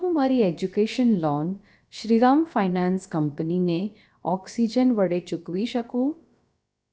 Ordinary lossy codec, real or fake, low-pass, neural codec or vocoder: none; fake; none; codec, 16 kHz, about 1 kbps, DyCAST, with the encoder's durations